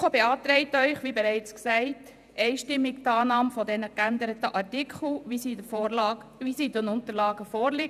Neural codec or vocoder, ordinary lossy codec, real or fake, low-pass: vocoder, 48 kHz, 128 mel bands, Vocos; none; fake; 14.4 kHz